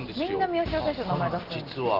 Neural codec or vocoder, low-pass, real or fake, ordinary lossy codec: none; 5.4 kHz; real; Opus, 16 kbps